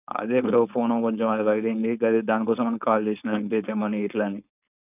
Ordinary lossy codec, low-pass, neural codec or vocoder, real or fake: none; 3.6 kHz; codec, 16 kHz, 4.8 kbps, FACodec; fake